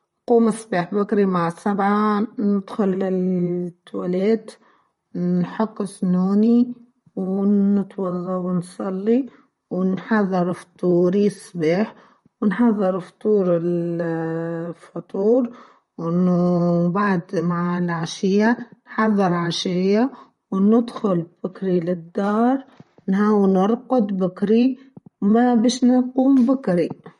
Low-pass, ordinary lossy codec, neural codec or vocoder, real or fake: 19.8 kHz; MP3, 48 kbps; vocoder, 44.1 kHz, 128 mel bands, Pupu-Vocoder; fake